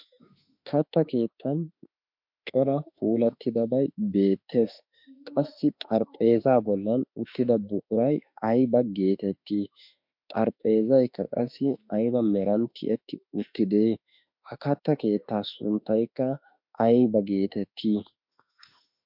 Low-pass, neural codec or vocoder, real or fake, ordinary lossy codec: 5.4 kHz; autoencoder, 48 kHz, 32 numbers a frame, DAC-VAE, trained on Japanese speech; fake; MP3, 48 kbps